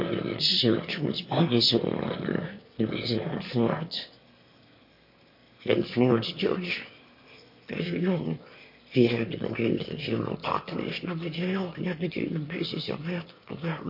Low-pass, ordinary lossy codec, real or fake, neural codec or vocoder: 5.4 kHz; MP3, 32 kbps; fake; autoencoder, 22.05 kHz, a latent of 192 numbers a frame, VITS, trained on one speaker